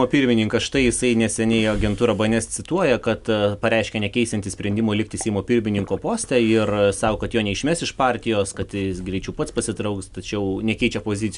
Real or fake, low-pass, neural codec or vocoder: real; 10.8 kHz; none